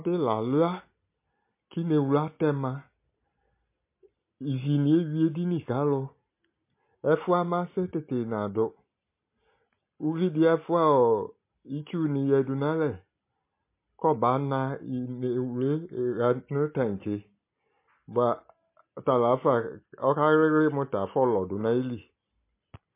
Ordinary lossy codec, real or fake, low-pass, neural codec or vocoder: MP3, 24 kbps; real; 3.6 kHz; none